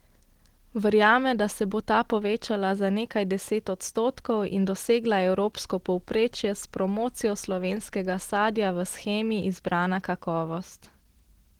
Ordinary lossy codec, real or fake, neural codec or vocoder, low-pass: Opus, 16 kbps; real; none; 19.8 kHz